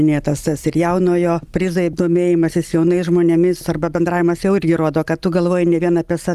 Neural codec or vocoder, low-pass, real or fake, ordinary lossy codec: none; 14.4 kHz; real; Opus, 32 kbps